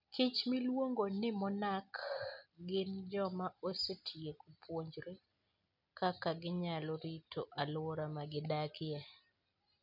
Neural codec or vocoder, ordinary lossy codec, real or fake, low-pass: none; none; real; 5.4 kHz